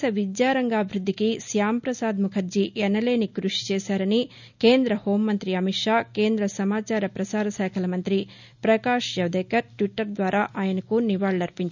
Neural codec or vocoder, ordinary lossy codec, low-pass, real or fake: none; none; 7.2 kHz; real